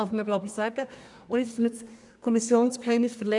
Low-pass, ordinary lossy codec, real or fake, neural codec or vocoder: 10.8 kHz; none; fake; codec, 24 kHz, 1 kbps, SNAC